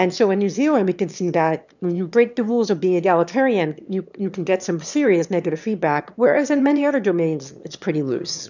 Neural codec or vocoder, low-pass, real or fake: autoencoder, 22.05 kHz, a latent of 192 numbers a frame, VITS, trained on one speaker; 7.2 kHz; fake